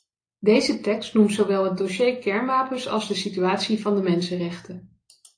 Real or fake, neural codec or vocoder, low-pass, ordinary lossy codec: real; none; 9.9 kHz; AAC, 48 kbps